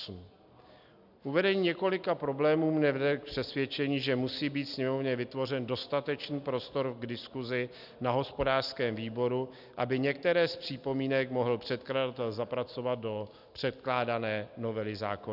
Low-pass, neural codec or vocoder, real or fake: 5.4 kHz; none; real